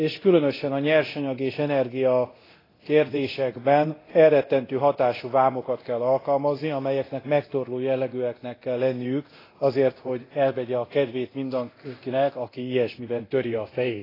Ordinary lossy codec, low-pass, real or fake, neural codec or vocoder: AAC, 24 kbps; 5.4 kHz; fake; codec, 24 kHz, 0.9 kbps, DualCodec